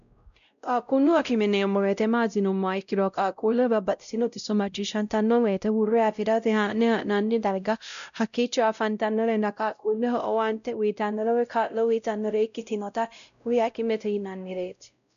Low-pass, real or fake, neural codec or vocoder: 7.2 kHz; fake; codec, 16 kHz, 0.5 kbps, X-Codec, WavLM features, trained on Multilingual LibriSpeech